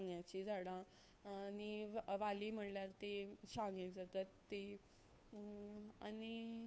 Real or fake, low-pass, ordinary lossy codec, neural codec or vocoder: fake; none; none; codec, 16 kHz, 2 kbps, FunCodec, trained on Chinese and English, 25 frames a second